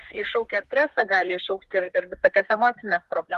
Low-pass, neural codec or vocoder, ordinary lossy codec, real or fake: 14.4 kHz; codec, 32 kHz, 1.9 kbps, SNAC; Opus, 16 kbps; fake